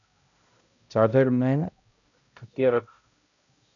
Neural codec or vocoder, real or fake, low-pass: codec, 16 kHz, 0.5 kbps, X-Codec, HuBERT features, trained on balanced general audio; fake; 7.2 kHz